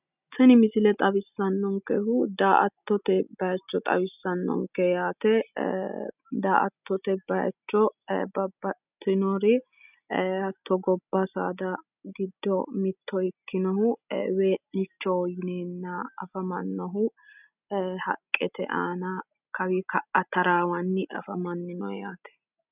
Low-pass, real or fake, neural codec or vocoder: 3.6 kHz; real; none